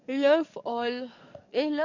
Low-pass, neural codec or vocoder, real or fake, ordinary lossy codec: 7.2 kHz; codec, 44.1 kHz, 7.8 kbps, DAC; fake; none